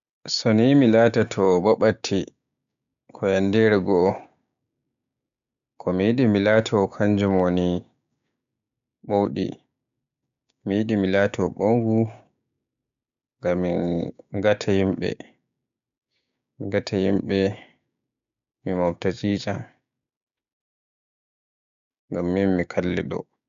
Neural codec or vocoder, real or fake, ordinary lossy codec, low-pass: codec, 16 kHz, 6 kbps, DAC; fake; AAC, 96 kbps; 7.2 kHz